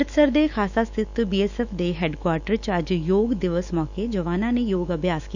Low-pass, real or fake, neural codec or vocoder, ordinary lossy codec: 7.2 kHz; fake; autoencoder, 48 kHz, 128 numbers a frame, DAC-VAE, trained on Japanese speech; none